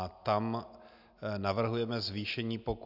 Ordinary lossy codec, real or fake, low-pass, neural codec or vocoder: AAC, 48 kbps; real; 5.4 kHz; none